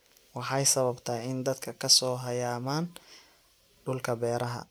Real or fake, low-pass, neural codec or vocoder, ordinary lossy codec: real; none; none; none